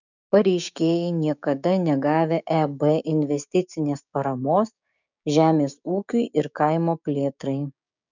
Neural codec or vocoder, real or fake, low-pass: codec, 16 kHz, 6 kbps, DAC; fake; 7.2 kHz